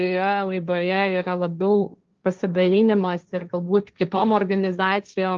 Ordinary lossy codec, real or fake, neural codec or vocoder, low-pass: Opus, 24 kbps; fake; codec, 16 kHz, 1.1 kbps, Voila-Tokenizer; 7.2 kHz